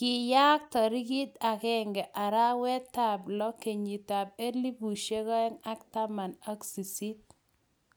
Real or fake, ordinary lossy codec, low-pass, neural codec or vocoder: real; none; none; none